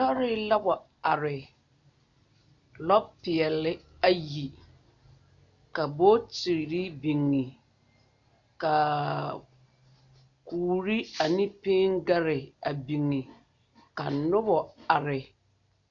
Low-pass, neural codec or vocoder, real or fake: 7.2 kHz; none; real